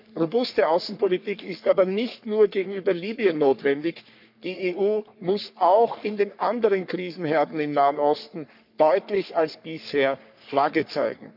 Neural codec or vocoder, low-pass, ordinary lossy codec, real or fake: codec, 44.1 kHz, 3.4 kbps, Pupu-Codec; 5.4 kHz; none; fake